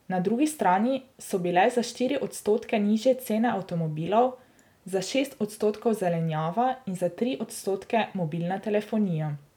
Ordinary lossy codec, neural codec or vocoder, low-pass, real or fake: none; none; 19.8 kHz; real